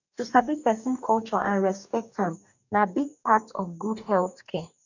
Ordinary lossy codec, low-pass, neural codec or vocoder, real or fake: none; 7.2 kHz; codec, 44.1 kHz, 2.6 kbps, DAC; fake